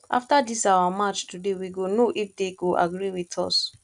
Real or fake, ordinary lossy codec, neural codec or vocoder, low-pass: real; none; none; 10.8 kHz